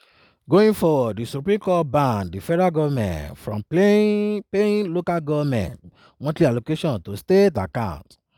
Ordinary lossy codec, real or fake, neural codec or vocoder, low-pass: none; real; none; 19.8 kHz